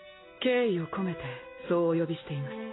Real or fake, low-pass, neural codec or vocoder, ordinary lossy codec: real; 7.2 kHz; none; AAC, 16 kbps